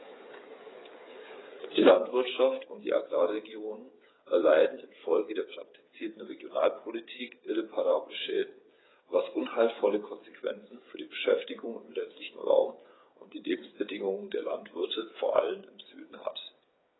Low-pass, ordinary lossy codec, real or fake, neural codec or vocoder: 7.2 kHz; AAC, 16 kbps; fake; codec, 16 kHz, 8 kbps, FreqCodec, smaller model